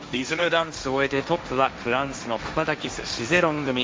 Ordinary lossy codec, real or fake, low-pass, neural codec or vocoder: none; fake; none; codec, 16 kHz, 1.1 kbps, Voila-Tokenizer